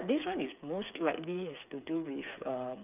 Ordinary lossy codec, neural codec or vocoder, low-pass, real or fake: none; codec, 16 kHz, 8 kbps, FreqCodec, smaller model; 3.6 kHz; fake